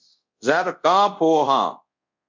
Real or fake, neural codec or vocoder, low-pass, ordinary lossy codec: fake; codec, 24 kHz, 0.5 kbps, DualCodec; 7.2 kHz; MP3, 64 kbps